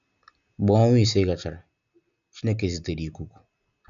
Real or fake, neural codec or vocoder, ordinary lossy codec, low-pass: real; none; none; 7.2 kHz